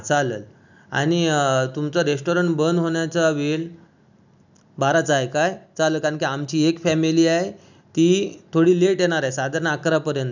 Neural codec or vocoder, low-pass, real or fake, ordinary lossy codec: none; 7.2 kHz; real; none